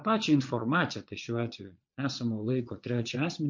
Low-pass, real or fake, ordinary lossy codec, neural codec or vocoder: 7.2 kHz; fake; MP3, 48 kbps; vocoder, 22.05 kHz, 80 mel bands, Vocos